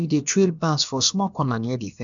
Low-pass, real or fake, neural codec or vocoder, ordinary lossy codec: 7.2 kHz; fake; codec, 16 kHz, about 1 kbps, DyCAST, with the encoder's durations; none